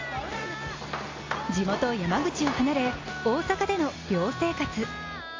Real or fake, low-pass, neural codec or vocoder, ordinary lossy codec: real; 7.2 kHz; none; AAC, 32 kbps